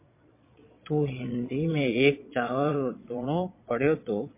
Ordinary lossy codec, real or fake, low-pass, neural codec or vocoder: MP3, 24 kbps; fake; 3.6 kHz; vocoder, 22.05 kHz, 80 mel bands, Vocos